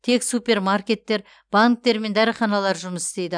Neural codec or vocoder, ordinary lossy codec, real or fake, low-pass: none; none; real; 9.9 kHz